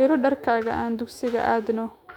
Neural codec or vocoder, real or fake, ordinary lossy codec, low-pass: autoencoder, 48 kHz, 128 numbers a frame, DAC-VAE, trained on Japanese speech; fake; none; 19.8 kHz